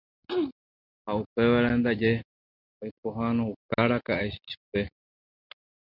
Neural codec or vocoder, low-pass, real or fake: none; 5.4 kHz; real